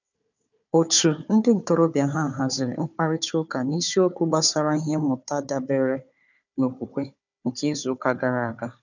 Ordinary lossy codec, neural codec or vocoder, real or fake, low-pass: none; codec, 16 kHz, 4 kbps, FunCodec, trained on Chinese and English, 50 frames a second; fake; 7.2 kHz